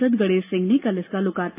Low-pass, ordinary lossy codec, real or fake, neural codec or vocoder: 3.6 kHz; AAC, 24 kbps; real; none